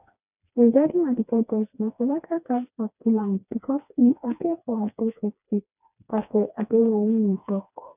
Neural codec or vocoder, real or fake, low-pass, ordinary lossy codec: codec, 16 kHz, 2 kbps, FreqCodec, smaller model; fake; 3.6 kHz; none